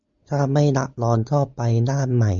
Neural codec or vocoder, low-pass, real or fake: none; 7.2 kHz; real